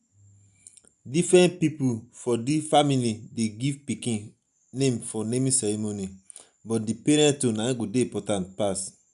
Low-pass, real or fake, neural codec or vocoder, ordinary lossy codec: 10.8 kHz; real; none; none